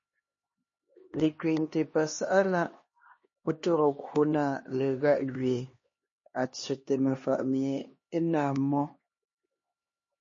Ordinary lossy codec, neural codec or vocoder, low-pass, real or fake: MP3, 32 kbps; codec, 16 kHz, 2 kbps, X-Codec, HuBERT features, trained on LibriSpeech; 7.2 kHz; fake